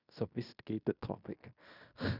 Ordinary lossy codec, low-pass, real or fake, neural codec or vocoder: none; 5.4 kHz; fake; codec, 16 kHz in and 24 kHz out, 0.9 kbps, LongCat-Audio-Codec, fine tuned four codebook decoder